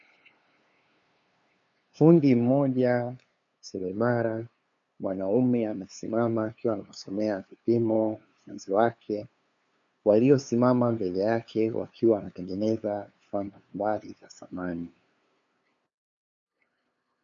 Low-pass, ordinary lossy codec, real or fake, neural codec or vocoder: 7.2 kHz; MP3, 48 kbps; fake; codec, 16 kHz, 2 kbps, FunCodec, trained on LibriTTS, 25 frames a second